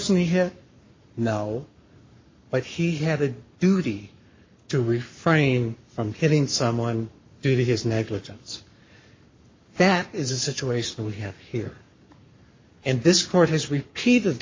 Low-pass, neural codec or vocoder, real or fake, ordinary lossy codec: 7.2 kHz; codec, 44.1 kHz, 7.8 kbps, Pupu-Codec; fake; MP3, 32 kbps